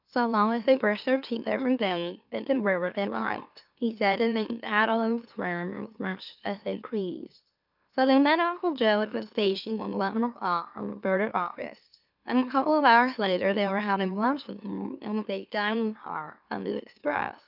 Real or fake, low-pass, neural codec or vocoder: fake; 5.4 kHz; autoencoder, 44.1 kHz, a latent of 192 numbers a frame, MeloTTS